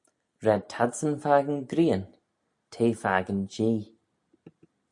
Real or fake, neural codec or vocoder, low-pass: real; none; 10.8 kHz